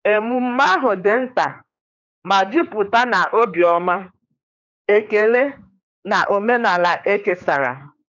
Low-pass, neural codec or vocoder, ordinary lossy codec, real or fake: 7.2 kHz; codec, 16 kHz, 4 kbps, X-Codec, HuBERT features, trained on general audio; none; fake